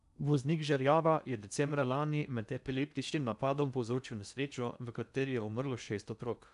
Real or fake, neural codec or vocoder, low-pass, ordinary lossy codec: fake; codec, 16 kHz in and 24 kHz out, 0.8 kbps, FocalCodec, streaming, 65536 codes; 10.8 kHz; none